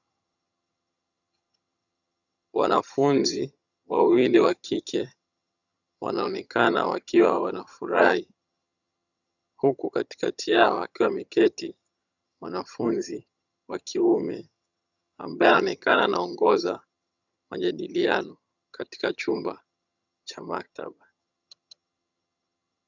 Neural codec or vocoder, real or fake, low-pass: vocoder, 22.05 kHz, 80 mel bands, HiFi-GAN; fake; 7.2 kHz